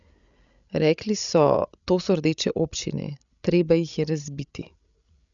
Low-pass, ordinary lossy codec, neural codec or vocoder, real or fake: 7.2 kHz; none; codec, 16 kHz, 16 kbps, FreqCodec, larger model; fake